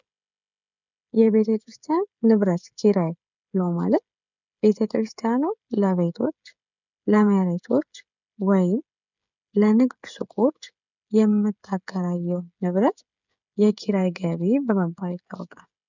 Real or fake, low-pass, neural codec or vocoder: fake; 7.2 kHz; codec, 16 kHz, 16 kbps, FreqCodec, smaller model